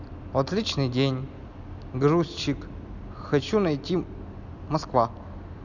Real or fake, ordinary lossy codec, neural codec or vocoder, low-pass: real; none; none; 7.2 kHz